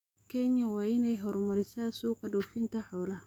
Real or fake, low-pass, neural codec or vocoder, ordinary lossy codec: fake; 19.8 kHz; codec, 44.1 kHz, 7.8 kbps, DAC; none